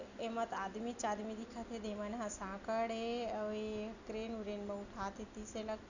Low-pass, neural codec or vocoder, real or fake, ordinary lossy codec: 7.2 kHz; none; real; none